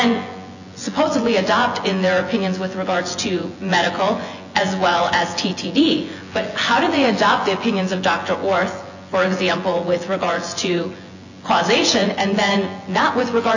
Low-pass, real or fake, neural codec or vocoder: 7.2 kHz; fake; vocoder, 24 kHz, 100 mel bands, Vocos